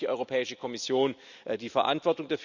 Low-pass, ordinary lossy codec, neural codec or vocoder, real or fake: 7.2 kHz; none; none; real